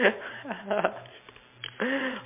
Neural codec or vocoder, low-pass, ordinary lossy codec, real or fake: none; 3.6 kHz; MP3, 32 kbps; real